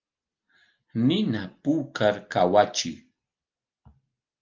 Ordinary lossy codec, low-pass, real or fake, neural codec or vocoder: Opus, 32 kbps; 7.2 kHz; real; none